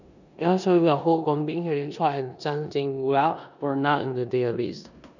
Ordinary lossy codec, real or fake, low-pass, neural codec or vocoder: none; fake; 7.2 kHz; codec, 16 kHz in and 24 kHz out, 0.9 kbps, LongCat-Audio-Codec, four codebook decoder